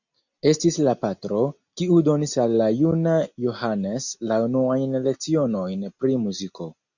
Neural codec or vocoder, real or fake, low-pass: none; real; 7.2 kHz